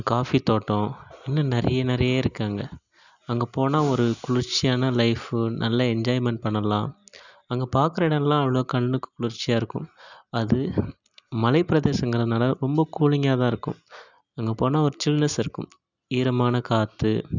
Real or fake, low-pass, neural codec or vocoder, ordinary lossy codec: real; 7.2 kHz; none; none